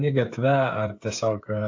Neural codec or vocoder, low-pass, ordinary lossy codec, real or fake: codec, 16 kHz, 8 kbps, FreqCodec, smaller model; 7.2 kHz; AAC, 48 kbps; fake